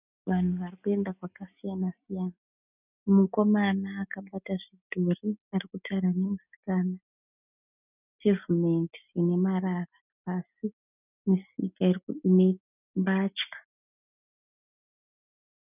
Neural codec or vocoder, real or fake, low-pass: none; real; 3.6 kHz